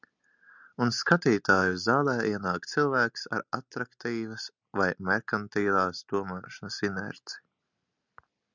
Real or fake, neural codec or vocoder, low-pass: real; none; 7.2 kHz